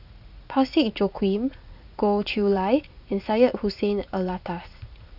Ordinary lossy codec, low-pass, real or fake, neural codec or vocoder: none; 5.4 kHz; fake; vocoder, 44.1 kHz, 80 mel bands, Vocos